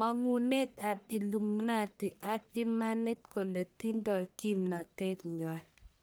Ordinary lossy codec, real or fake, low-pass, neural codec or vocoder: none; fake; none; codec, 44.1 kHz, 1.7 kbps, Pupu-Codec